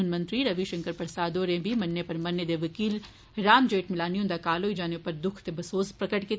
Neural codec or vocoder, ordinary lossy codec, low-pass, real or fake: none; none; none; real